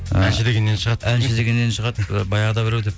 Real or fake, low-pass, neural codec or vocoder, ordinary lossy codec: real; none; none; none